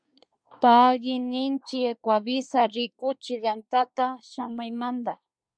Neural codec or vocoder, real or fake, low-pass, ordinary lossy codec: codec, 24 kHz, 1 kbps, SNAC; fake; 9.9 kHz; MP3, 64 kbps